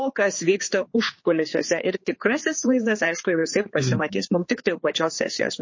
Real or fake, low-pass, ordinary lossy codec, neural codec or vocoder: fake; 7.2 kHz; MP3, 32 kbps; codec, 16 kHz, 4 kbps, X-Codec, HuBERT features, trained on general audio